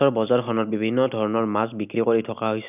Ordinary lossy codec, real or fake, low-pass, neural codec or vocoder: none; real; 3.6 kHz; none